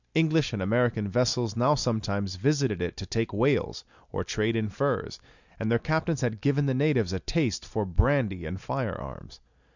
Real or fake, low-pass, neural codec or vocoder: real; 7.2 kHz; none